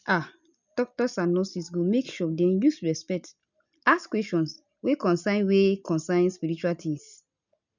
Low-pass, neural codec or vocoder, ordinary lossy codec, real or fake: 7.2 kHz; none; none; real